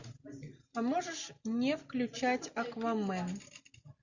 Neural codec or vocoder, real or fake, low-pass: none; real; 7.2 kHz